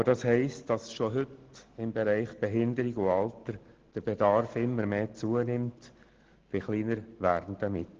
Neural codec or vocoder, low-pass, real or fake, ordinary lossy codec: none; 7.2 kHz; real; Opus, 16 kbps